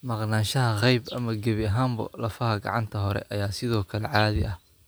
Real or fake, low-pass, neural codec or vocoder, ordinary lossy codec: real; none; none; none